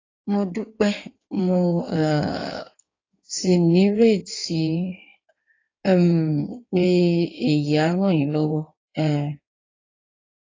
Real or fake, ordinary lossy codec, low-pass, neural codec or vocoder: fake; AAC, 32 kbps; 7.2 kHz; codec, 16 kHz in and 24 kHz out, 1.1 kbps, FireRedTTS-2 codec